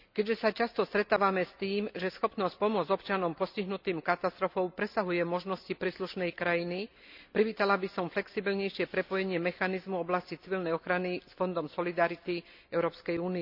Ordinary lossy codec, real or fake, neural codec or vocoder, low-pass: none; real; none; 5.4 kHz